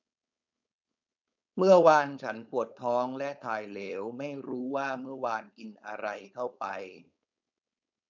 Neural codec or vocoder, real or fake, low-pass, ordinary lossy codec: codec, 16 kHz, 4.8 kbps, FACodec; fake; 7.2 kHz; none